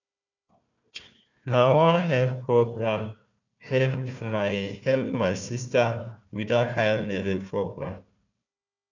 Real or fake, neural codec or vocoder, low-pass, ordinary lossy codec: fake; codec, 16 kHz, 1 kbps, FunCodec, trained on Chinese and English, 50 frames a second; 7.2 kHz; none